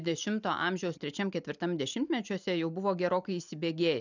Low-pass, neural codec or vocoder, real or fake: 7.2 kHz; none; real